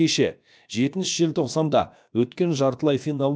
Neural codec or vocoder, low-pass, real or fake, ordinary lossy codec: codec, 16 kHz, about 1 kbps, DyCAST, with the encoder's durations; none; fake; none